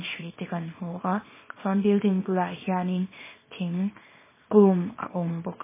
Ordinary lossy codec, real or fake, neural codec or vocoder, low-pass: MP3, 16 kbps; fake; codec, 24 kHz, 0.9 kbps, WavTokenizer, small release; 3.6 kHz